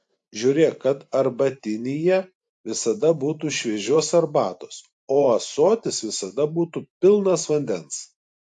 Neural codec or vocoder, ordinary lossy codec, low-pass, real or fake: vocoder, 44.1 kHz, 128 mel bands every 512 samples, BigVGAN v2; AAC, 64 kbps; 10.8 kHz; fake